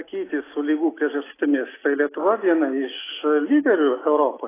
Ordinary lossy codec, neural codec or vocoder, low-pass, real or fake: AAC, 16 kbps; none; 3.6 kHz; real